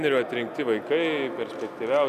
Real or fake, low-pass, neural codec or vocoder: real; 14.4 kHz; none